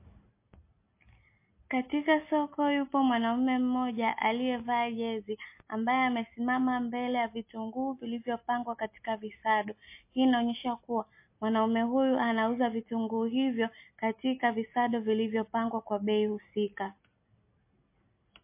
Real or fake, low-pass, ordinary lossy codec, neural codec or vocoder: real; 3.6 kHz; MP3, 24 kbps; none